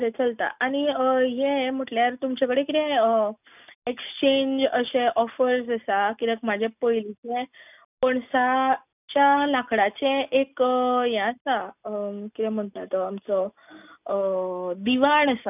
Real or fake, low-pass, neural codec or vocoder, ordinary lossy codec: real; 3.6 kHz; none; none